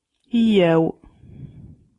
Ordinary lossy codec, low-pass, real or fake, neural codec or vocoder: AAC, 32 kbps; 10.8 kHz; real; none